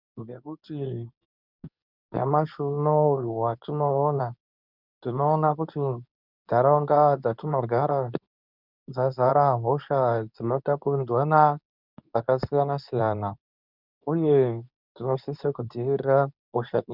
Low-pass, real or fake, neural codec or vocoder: 5.4 kHz; fake; codec, 24 kHz, 0.9 kbps, WavTokenizer, medium speech release version 2